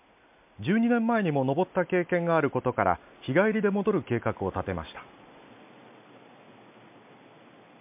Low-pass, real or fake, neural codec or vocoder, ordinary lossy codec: 3.6 kHz; real; none; MP3, 32 kbps